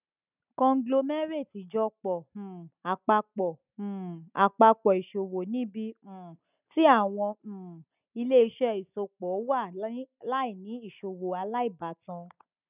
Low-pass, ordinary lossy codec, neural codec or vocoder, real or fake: 3.6 kHz; none; none; real